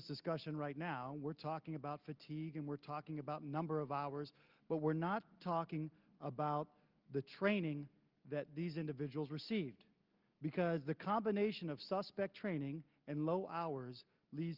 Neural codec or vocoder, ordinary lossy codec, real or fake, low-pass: none; Opus, 32 kbps; real; 5.4 kHz